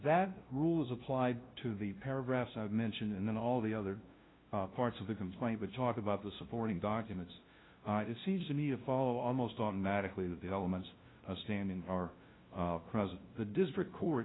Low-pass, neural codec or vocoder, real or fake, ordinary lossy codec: 7.2 kHz; codec, 16 kHz, 0.5 kbps, FunCodec, trained on LibriTTS, 25 frames a second; fake; AAC, 16 kbps